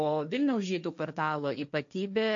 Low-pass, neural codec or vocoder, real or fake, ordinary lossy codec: 7.2 kHz; codec, 16 kHz, 1.1 kbps, Voila-Tokenizer; fake; AAC, 64 kbps